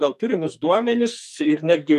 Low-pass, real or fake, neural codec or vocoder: 14.4 kHz; fake; codec, 44.1 kHz, 2.6 kbps, SNAC